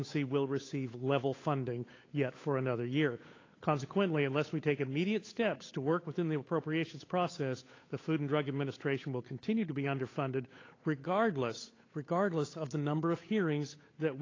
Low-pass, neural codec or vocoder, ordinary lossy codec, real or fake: 7.2 kHz; codec, 16 kHz, 8 kbps, FunCodec, trained on Chinese and English, 25 frames a second; AAC, 32 kbps; fake